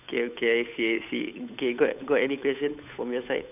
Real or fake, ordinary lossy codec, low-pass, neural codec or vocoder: fake; none; 3.6 kHz; codec, 16 kHz, 8 kbps, FunCodec, trained on Chinese and English, 25 frames a second